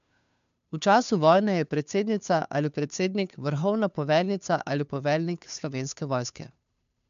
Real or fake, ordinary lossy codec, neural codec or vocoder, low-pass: fake; MP3, 64 kbps; codec, 16 kHz, 2 kbps, FunCodec, trained on Chinese and English, 25 frames a second; 7.2 kHz